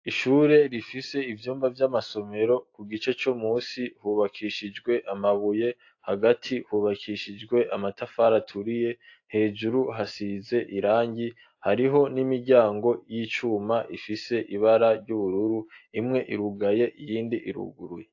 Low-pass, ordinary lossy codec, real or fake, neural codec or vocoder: 7.2 kHz; AAC, 48 kbps; real; none